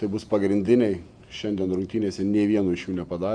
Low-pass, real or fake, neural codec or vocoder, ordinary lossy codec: 9.9 kHz; fake; vocoder, 48 kHz, 128 mel bands, Vocos; Opus, 64 kbps